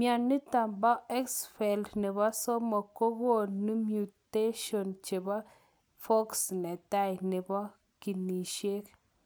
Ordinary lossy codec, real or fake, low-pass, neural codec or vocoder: none; real; none; none